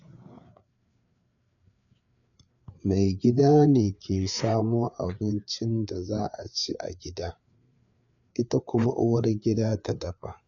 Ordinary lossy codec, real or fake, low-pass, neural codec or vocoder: none; fake; 7.2 kHz; codec, 16 kHz, 4 kbps, FreqCodec, larger model